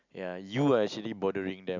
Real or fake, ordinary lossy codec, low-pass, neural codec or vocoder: real; none; 7.2 kHz; none